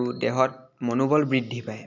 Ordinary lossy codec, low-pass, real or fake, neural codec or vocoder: none; 7.2 kHz; real; none